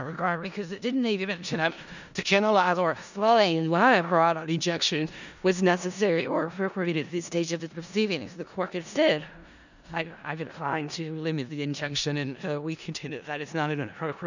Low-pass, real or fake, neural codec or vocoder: 7.2 kHz; fake; codec, 16 kHz in and 24 kHz out, 0.4 kbps, LongCat-Audio-Codec, four codebook decoder